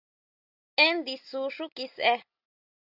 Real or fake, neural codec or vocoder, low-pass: real; none; 5.4 kHz